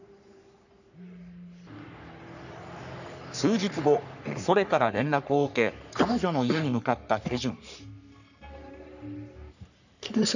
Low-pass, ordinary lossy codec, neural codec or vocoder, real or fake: 7.2 kHz; none; codec, 44.1 kHz, 3.4 kbps, Pupu-Codec; fake